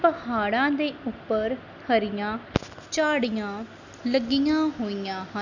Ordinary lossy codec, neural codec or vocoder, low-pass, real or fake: none; none; 7.2 kHz; real